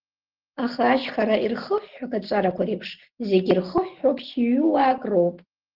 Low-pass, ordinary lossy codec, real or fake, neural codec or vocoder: 5.4 kHz; Opus, 16 kbps; real; none